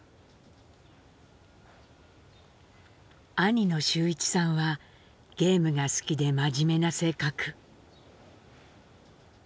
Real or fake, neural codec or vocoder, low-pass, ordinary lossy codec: real; none; none; none